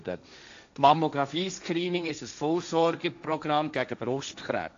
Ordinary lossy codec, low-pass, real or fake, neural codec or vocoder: AAC, 64 kbps; 7.2 kHz; fake; codec, 16 kHz, 1.1 kbps, Voila-Tokenizer